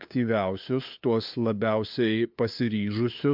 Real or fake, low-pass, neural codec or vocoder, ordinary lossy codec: fake; 5.4 kHz; codec, 16 kHz, 2 kbps, FunCodec, trained on LibriTTS, 25 frames a second; MP3, 48 kbps